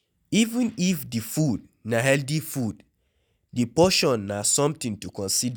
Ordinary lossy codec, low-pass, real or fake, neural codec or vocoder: none; none; real; none